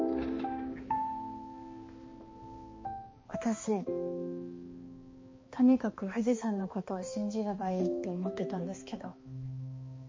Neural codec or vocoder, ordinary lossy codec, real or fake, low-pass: codec, 16 kHz, 2 kbps, X-Codec, HuBERT features, trained on balanced general audio; MP3, 32 kbps; fake; 7.2 kHz